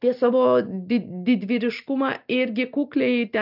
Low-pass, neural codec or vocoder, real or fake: 5.4 kHz; none; real